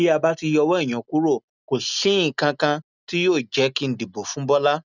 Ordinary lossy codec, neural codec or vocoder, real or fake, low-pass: none; none; real; 7.2 kHz